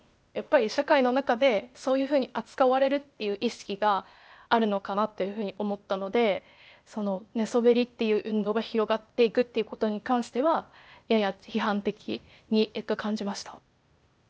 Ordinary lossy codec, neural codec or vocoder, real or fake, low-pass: none; codec, 16 kHz, 0.8 kbps, ZipCodec; fake; none